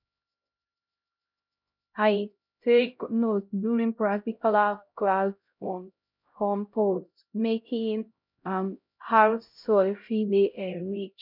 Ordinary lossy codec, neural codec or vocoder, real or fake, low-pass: none; codec, 16 kHz, 0.5 kbps, X-Codec, HuBERT features, trained on LibriSpeech; fake; 5.4 kHz